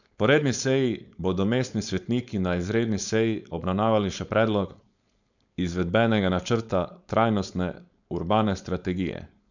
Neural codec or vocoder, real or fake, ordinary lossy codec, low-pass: codec, 16 kHz, 4.8 kbps, FACodec; fake; none; 7.2 kHz